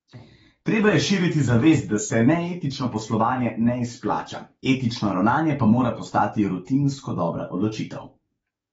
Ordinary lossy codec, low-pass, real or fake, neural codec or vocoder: AAC, 24 kbps; 19.8 kHz; fake; codec, 44.1 kHz, 7.8 kbps, DAC